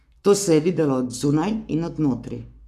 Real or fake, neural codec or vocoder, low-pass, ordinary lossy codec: fake; codec, 44.1 kHz, 7.8 kbps, Pupu-Codec; 14.4 kHz; none